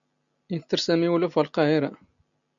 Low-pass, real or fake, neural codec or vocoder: 7.2 kHz; real; none